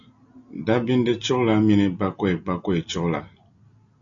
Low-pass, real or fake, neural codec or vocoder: 7.2 kHz; real; none